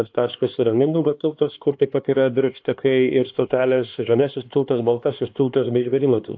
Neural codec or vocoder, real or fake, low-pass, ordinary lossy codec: codec, 16 kHz, 2 kbps, X-Codec, HuBERT features, trained on LibriSpeech; fake; 7.2 kHz; AAC, 48 kbps